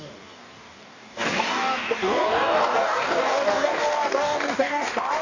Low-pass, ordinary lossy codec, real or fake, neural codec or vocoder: 7.2 kHz; none; fake; codec, 44.1 kHz, 2.6 kbps, DAC